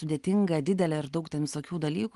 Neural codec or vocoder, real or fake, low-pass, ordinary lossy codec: none; real; 10.8 kHz; Opus, 24 kbps